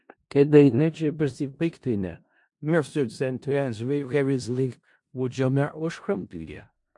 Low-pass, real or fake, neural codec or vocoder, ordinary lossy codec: 10.8 kHz; fake; codec, 16 kHz in and 24 kHz out, 0.4 kbps, LongCat-Audio-Codec, four codebook decoder; MP3, 64 kbps